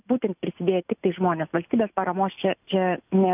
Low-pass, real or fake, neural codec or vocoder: 3.6 kHz; real; none